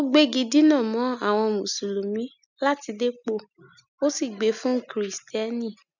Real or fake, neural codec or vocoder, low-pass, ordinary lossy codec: real; none; 7.2 kHz; none